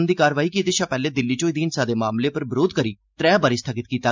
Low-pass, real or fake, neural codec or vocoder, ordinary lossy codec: 7.2 kHz; real; none; MP3, 64 kbps